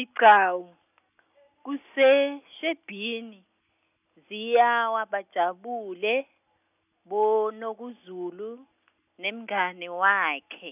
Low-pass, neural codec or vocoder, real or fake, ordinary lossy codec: 3.6 kHz; none; real; none